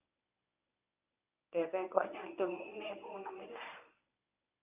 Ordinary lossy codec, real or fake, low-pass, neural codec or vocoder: none; fake; 3.6 kHz; codec, 24 kHz, 0.9 kbps, WavTokenizer, medium speech release version 1